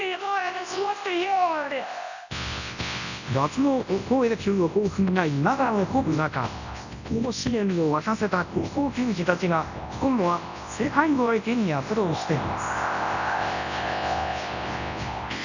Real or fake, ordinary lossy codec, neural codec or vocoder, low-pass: fake; none; codec, 24 kHz, 0.9 kbps, WavTokenizer, large speech release; 7.2 kHz